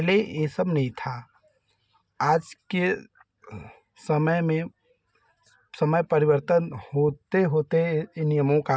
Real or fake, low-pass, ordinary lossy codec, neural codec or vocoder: real; none; none; none